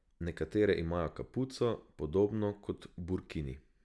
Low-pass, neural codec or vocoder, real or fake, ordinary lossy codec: none; none; real; none